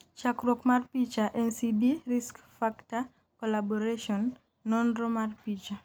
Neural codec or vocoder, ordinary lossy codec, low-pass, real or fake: none; none; none; real